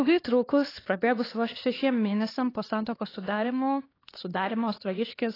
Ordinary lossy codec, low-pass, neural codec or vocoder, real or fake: AAC, 24 kbps; 5.4 kHz; codec, 16 kHz, 4 kbps, X-Codec, HuBERT features, trained on LibriSpeech; fake